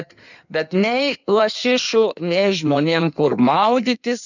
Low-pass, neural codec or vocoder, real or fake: 7.2 kHz; codec, 16 kHz in and 24 kHz out, 1.1 kbps, FireRedTTS-2 codec; fake